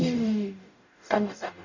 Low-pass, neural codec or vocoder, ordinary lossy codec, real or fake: 7.2 kHz; codec, 44.1 kHz, 0.9 kbps, DAC; none; fake